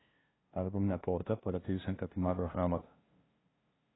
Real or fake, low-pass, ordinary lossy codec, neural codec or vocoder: fake; 7.2 kHz; AAC, 16 kbps; codec, 16 kHz, 0.5 kbps, FunCodec, trained on LibriTTS, 25 frames a second